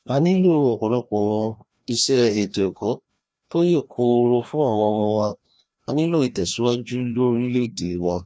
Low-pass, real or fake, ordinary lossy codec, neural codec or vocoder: none; fake; none; codec, 16 kHz, 1 kbps, FreqCodec, larger model